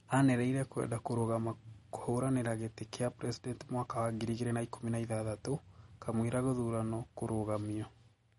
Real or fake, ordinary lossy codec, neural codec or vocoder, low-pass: real; MP3, 48 kbps; none; 19.8 kHz